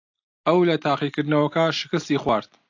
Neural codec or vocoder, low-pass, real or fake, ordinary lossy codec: none; 7.2 kHz; real; AAC, 48 kbps